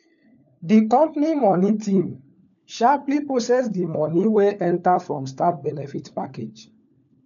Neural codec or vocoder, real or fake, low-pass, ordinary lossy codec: codec, 16 kHz, 4 kbps, FunCodec, trained on LibriTTS, 50 frames a second; fake; 7.2 kHz; none